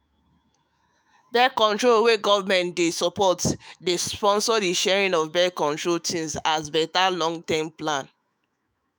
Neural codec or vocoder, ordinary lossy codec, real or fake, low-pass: autoencoder, 48 kHz, 128 numbers a frame, DAC-VAE, trained on Japanese speech; none; fake; none